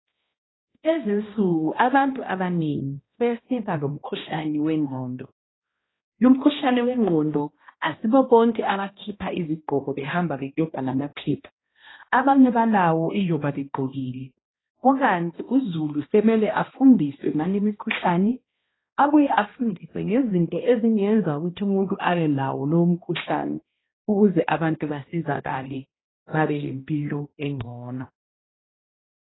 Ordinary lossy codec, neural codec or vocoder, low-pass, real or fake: AAC, 16 kbps; codec, 16 kHz, 1 kbps, X-Codec, HuBERT features, trained on balanced general audio; 7.2 kHz; fake